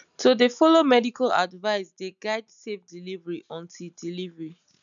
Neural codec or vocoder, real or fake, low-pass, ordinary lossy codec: none; real; 7.2 kHz; none